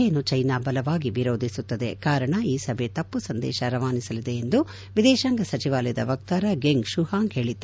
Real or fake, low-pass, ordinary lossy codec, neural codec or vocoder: real; none; none; none